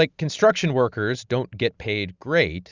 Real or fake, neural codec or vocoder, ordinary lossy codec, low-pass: real; none; Opus, 64 kbps; 7.2 kHz